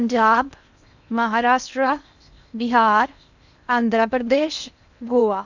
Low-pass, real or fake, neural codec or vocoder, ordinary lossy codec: 7.2 kHz; fake; codec, 16 kHz in and 24 kHz out, 0.8 kbps, FocalCodec, streaming, 65536 codes; none